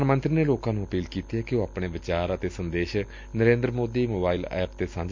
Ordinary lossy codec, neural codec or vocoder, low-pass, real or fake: MP3, 64 kbps; none; 7.2 kHz; real